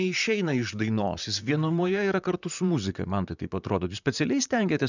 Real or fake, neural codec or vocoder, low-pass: fake; vocoder, 44.1 kHz, 128 mel bands, Pupu-Vocoder; 7.2 kHz